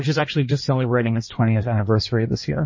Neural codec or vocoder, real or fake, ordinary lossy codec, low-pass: codec, 16 kHz in and 24 kHz out, 1.1 kbps, FireRedTTS-2 codec; fake; MP3, 32 kbps; 7.2 kHz